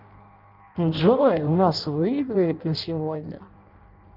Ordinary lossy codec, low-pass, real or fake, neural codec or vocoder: Opus, 32 kbps; 5.4 kHz; fake; codec, 16 kHz in and 24 kHz out, 0.6 kbps, FireRedTTS-2 codec